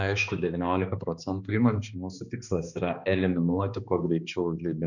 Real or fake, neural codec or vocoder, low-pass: fake; codec, 16 kHz, 2 kbps, X-Codec, HuBERT features, trained on balanced general audio; 7.2 kHz